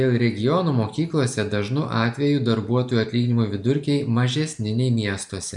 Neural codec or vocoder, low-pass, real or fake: none; 10.8 kHz; real